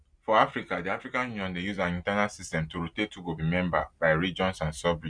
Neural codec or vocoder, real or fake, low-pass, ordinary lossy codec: none; real; 9.9 kHz; Opus, 64 kbps